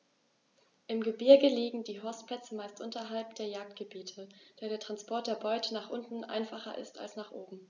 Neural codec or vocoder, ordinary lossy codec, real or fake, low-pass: none; none; real; 7.2 kHz